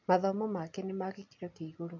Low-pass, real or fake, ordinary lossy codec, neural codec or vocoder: 7.2 kHz; real; MP3, 48 kbps; none